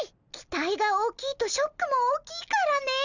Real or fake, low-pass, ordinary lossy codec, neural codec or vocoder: real; 7.2 kHz; none; none